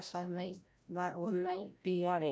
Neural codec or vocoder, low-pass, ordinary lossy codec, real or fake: codec, 16 kHz, 0.5 kbps, FreqCodec, larger model; none; none; fake